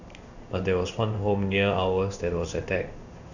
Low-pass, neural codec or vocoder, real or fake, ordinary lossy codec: 7.2 kHz; codec, 16 kHz in and 24 kHz out, 1 kbps, XY-Tokenizer; fake; none